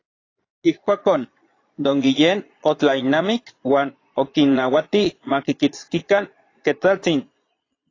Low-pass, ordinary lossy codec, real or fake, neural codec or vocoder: 7.2 kHz; AAC, 32 kbps; fake; vocoder, 22.05 kHz, 80 mel bands, Vocos